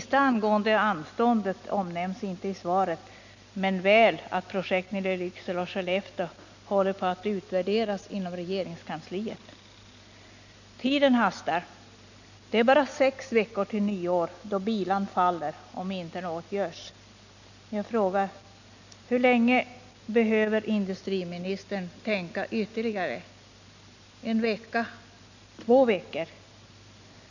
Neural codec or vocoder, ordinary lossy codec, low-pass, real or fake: none; none; 7.2 kHz; real